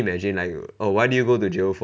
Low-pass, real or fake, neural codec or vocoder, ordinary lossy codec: none; real; none; none